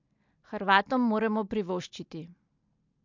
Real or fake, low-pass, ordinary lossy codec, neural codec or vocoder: real; 7.2 kHz; MP3, 64 kbps; none